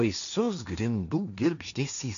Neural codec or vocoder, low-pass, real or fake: codec, 16 kHz, 1.1 kbps, Voila-Tokenizer; 7.2 kHz; fake